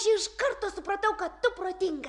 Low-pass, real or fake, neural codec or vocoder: 10.8 kHz; real; none